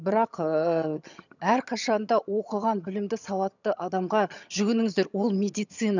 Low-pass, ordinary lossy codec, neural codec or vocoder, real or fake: 7.2 kHz; none; vocoder, 22.05 kHz, 80 mel bands, HiFi-GAN; fake